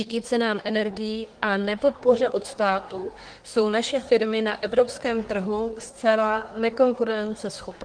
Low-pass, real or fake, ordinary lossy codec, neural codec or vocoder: 9.9 kHz; fake; Opus, 24 kbps; codec, 24 kHz, 1 kbps, SNAC